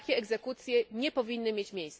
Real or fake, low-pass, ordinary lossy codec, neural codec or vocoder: real; none; none; none